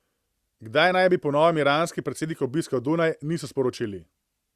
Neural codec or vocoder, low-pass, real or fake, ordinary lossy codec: none; 14.4 kHz; real; Opus, 64 kbps